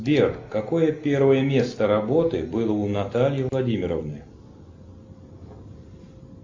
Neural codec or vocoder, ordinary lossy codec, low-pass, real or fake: none; MP3, 48 kbps; 7.2 kHz; real